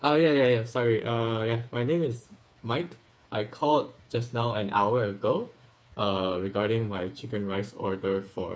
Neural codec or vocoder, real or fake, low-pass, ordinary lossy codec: codec, 16 kHz, 4 kbps, FreqCodec, smaller model; fake; none; none